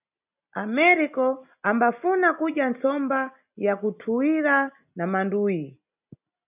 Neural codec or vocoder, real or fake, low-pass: none; real; 3.6 kHz